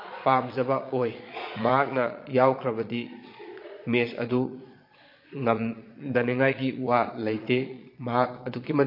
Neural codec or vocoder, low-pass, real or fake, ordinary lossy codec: vocoder, 22.05 kHz, 80 mel bands, Vocos; 5.4 kHz; fake; MP3, 32 kbps